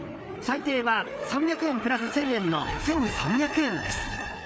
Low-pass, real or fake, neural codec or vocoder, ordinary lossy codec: none; fake; codec, 16 kHz, 4 kbps, FreqCodec, larger model; none